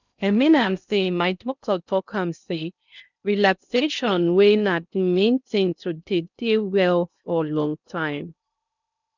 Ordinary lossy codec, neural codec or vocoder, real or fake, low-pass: none; codec, 16 kHz in and 24 kHz out, 0.6 kbps, FocalCodec, streaming, 2048 codes; fake; 7.2 kHz